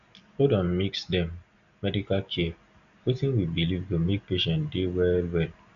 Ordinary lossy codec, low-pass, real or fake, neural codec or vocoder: none; 7.2 kHz; real; none